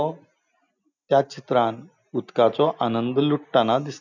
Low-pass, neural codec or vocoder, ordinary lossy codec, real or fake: 7.2 kHz; none; none; real